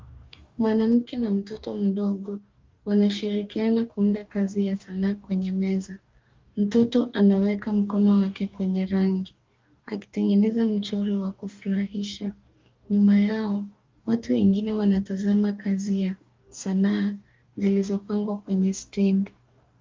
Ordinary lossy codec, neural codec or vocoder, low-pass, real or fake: Opus, 32 kbps; codec, 44.1 kHz, 2.6 kbps, DAC; 7.2 kHz; fake